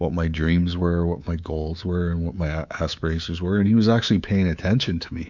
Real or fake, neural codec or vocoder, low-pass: fake; codec, 24 kHz, 3.1 kbps, DualCodec; 7.2 kHz